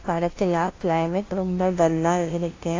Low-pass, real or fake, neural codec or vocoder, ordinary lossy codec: 7.2 kHz; fake; codec, 16 kHz, 0.5 kbps, FunCodec, trained on LibriTTS, 25 frames a second; AAC, 32 kbps